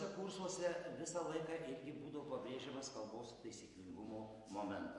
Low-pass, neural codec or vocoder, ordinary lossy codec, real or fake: 10.8 kHz; none; MP3, 48 kbps; real